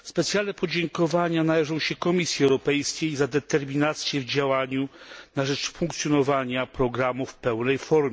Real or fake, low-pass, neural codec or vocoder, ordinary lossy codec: real; none; none; none